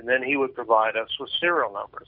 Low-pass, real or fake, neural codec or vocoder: 5.4 kHz; real; none